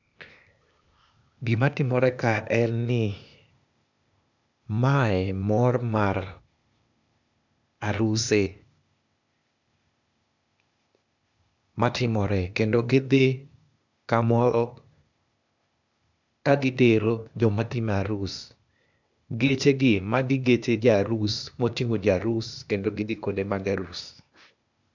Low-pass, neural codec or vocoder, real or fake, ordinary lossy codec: 7.2 kHz; codec, 16 kHz, 0.8 kbps, ZipCodec; fake; none